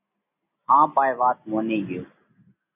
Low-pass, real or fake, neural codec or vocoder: 3.6 kHz; real; none